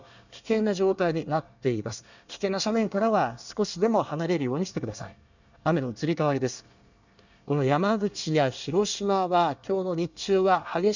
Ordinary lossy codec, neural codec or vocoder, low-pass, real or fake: none; codec, 24 kHz, 1 kbps, SNAC; 7.2 kHz; fake